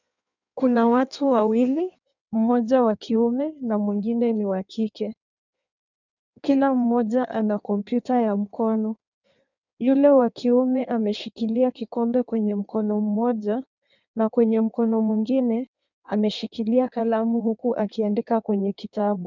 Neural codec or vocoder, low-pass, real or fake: codec, 16 kHz in and 24 kHz out, 1.1 kbps, FireRedTTS-2 codec; 7.2 kHz; fake